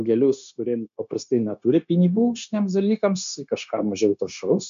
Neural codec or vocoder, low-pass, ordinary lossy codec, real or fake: codec, 16 kHz, 0.9 kbps, LongCat-Audio-Codec; 7.2 kHz; MP3, 64 kbps; fake